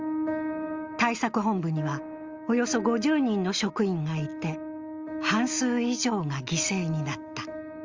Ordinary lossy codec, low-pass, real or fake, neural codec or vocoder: Opus, 32 kbps; 7.2 kHz; real; none